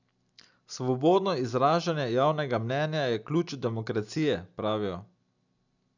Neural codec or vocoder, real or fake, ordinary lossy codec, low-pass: none; real; none; 7.2 kHz